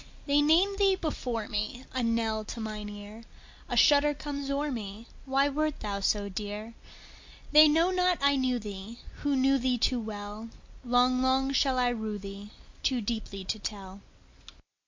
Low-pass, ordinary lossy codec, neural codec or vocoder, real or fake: 7.2 kHz; MP3, 48 kbps; none; real